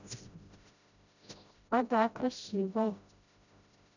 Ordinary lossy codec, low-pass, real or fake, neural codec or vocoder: none; 7.2 kHz; fake; codec, 16 kHz, 0.5 kbps, FreqCodec, smaller model